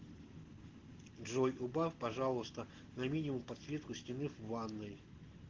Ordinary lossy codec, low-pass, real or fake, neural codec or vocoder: Opus, 16 kbps; 7.2 kHz; real; none